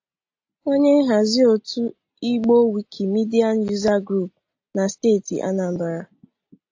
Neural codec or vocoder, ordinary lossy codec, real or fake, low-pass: none; AAC, 48 kbps; real; 7.2 kHz